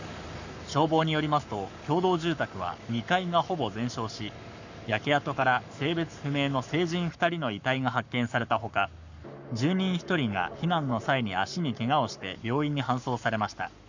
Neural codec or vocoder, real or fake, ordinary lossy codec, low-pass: codec, 44.1 kHz, 7.8 kbps, Pupu-Codec; fake; none; 7.2 kHz